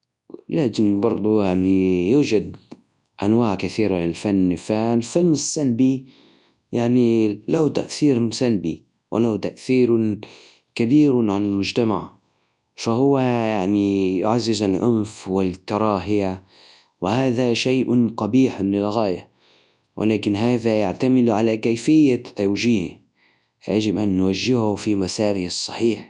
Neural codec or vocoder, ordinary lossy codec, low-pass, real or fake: codec, 24 kHz, 0.9 kbps, WavTokenizer, large speech release; none; 10.8 kHz; fake